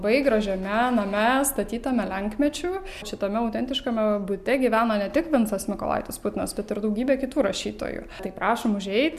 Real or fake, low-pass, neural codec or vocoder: real; 14.4 kHz; none